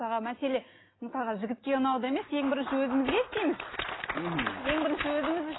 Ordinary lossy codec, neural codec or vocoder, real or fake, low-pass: AAC, 16 kbps; none; real; 7.2 kHz